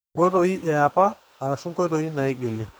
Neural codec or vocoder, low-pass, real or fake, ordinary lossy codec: codec, 44.1 kHz, 2.6 kbps, SNAC; none; fake; none